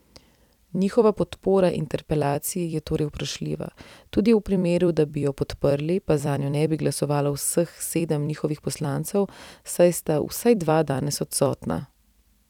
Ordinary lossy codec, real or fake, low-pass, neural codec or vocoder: none; fake; 19.8 kHz; vocoder, 44.1 kHz, 128 mel bands every 256 samples, BigVGAN v2